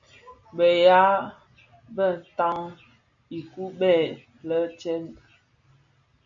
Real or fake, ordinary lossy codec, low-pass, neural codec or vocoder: real; AAC, 64 kbps; 7.2 kHz; none